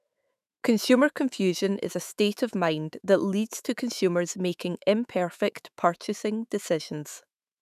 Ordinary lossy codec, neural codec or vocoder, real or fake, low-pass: none; autoencoder, 48 kHz, 128 numbers a frame, DAC-VAE, trained on Japanese speech; fake; 14.4 kHz